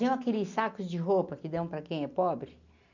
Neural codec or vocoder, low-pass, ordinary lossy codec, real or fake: none; 7.2 kHz; none; real